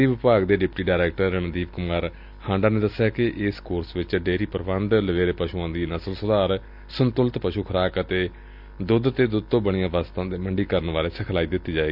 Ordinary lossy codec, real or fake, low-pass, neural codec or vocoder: none; real; 5.4 kHz; none